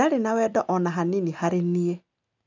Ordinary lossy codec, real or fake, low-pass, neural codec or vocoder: none; real; 7.2 kHz; none